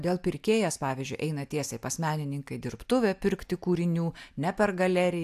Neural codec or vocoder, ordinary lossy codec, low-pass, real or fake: none; AAC, 96 kbps; 14.4 kHz; real